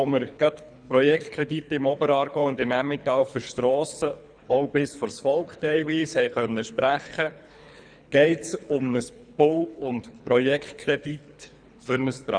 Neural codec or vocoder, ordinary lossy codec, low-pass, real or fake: codec, 24 kHz, 3 kbps, HILCodec; none; 9.9 kHz; fake